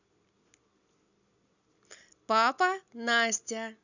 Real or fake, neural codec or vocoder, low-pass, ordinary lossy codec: real; none; 7.2 kHz; none